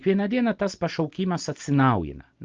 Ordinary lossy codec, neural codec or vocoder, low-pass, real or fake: Opus, 24 kbps; none; 7.2 kHz; real